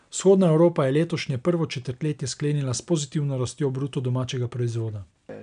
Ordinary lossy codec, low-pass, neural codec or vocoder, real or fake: none; 9.9 kHz; none; real